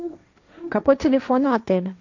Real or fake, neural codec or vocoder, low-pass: fake; codec, 16 kHz, 1.1 kbps, Voila-Tokenizer; 7.2 kHz